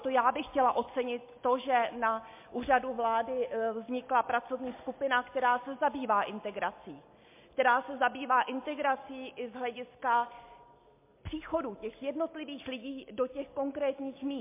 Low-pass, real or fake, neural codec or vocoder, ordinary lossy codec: 3.6 kHz; real; none; MP3, 24 kbps